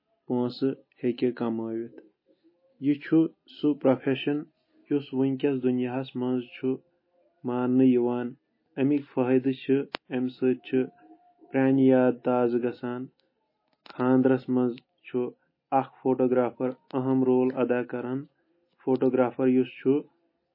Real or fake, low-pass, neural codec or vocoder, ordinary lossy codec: real; 5.4 kHz; none; MP3, 24 kbps